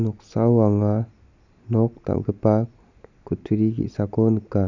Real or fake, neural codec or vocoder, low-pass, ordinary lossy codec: real; none; 7.2 kHz; none